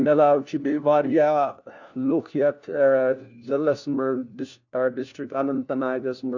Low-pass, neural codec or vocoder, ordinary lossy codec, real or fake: 7.2 kHz; codec, 16 kHz, 1 kbps, FunCodec, trained on LibriTTS, 50 frames a second; none; fake